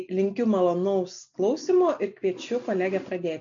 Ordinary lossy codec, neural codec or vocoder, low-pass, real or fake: AAC, 64 kbps; none; 7.2 kHz; real